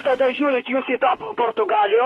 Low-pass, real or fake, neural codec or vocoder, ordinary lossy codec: 19.8 kHz; fake; autoencoder, 48 kHz, 32 numbers a frame, DAC-VAE, trained on Japanese speech; AAC, 32 kbps